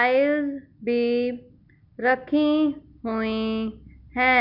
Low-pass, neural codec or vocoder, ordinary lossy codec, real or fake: 5.4 kHz; none; MP3, 48 kbps; real